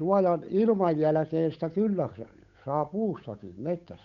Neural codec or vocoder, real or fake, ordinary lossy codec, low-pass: codec, 16 kHz, 8 kbps, FunCodec, trained on Chinese and English, 25 frames a second; fake; none; 7.2 kHz